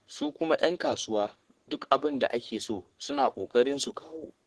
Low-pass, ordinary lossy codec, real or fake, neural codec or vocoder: 10.8 kHz; Opus, 16 kbps; fake; codec, 44.1 kHz, 3.4 kbps, Pupu-Codec